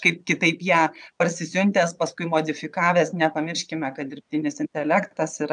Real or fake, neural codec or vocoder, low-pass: fake; vocoder, 22.05 kHz, 80 mel bands, Vocos; 9.9 kHz